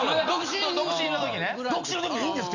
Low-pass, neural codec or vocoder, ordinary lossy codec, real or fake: 7.2 kHz; none; Opus, 64 kbps; real